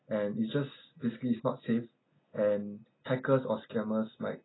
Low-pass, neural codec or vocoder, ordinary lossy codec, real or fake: 7.2 kHz; none; AAC, 16 kbps; real